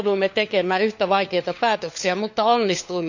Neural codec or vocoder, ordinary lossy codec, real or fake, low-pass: codec, 16 kHz, 4 kbps, FunCodec, trained on LibriTTS, 50 frames a second; none; fake; 7.2 kHz